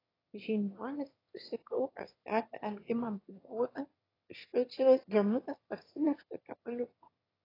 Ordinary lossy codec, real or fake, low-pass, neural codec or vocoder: AAC, 24 kbps; fake; 5.4 kHz; autoencoder, 22.05 kHz, a latent of 192 numbers a frame, VITS, trained on one speaker